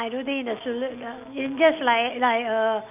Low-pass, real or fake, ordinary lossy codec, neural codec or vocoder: 3.6 kHz; real; none; none